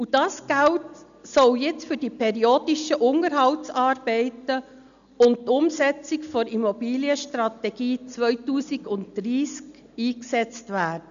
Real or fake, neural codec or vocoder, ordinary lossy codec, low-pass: real; none; none; 7.2 kHz